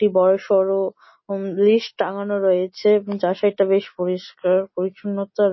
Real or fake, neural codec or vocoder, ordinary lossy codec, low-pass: real; none; MP3, 24 kbps; 7.2 kHz